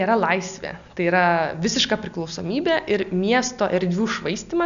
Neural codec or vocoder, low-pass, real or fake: none; 7.2 kHz; real